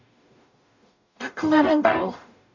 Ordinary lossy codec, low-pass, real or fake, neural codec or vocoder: none; 7.2 kHz; fake; codec, 44.1 kHz, 0.9 kbps, DAC